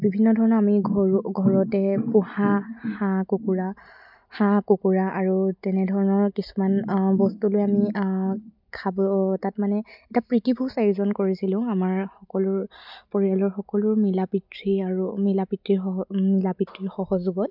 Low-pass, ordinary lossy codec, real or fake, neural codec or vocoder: 5.4 kHz; none; real; none